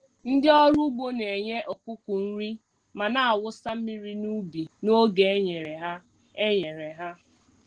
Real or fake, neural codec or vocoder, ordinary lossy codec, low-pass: real; none; Opus, 16 kbps; 9.9 kHz